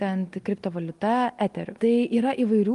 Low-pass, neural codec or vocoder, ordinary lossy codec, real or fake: 10.8 kHz; none; Opus, 32 kbps; real